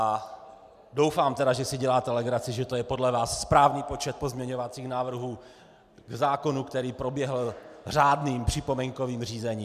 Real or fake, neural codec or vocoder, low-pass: real; none; 14.4 kHz